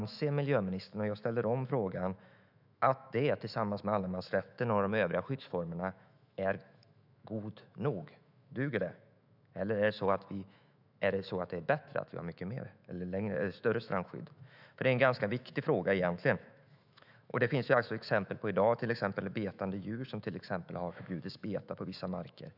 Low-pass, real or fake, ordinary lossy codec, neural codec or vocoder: 5.4 kHz; fake; none; autoencoder, 48 kHz, 128 numbers a frame, DAC-VAE, trained on Japanese speech